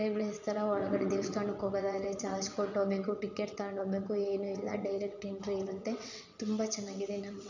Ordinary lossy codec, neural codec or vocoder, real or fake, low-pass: none; vocoder, 22.05 kHz, 80 mel bands, WaveNeXt; fake; 7.2 kHz